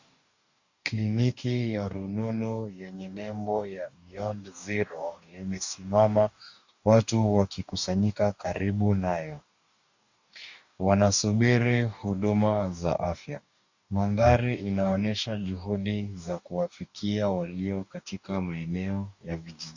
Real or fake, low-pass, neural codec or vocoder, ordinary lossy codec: fake; 7.2 kHz; codec, 44.1 kHz, 2.6 kbps, DAC; Opus, 64 kbps